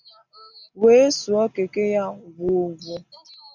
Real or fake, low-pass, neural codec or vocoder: real; 7.2 kHz; none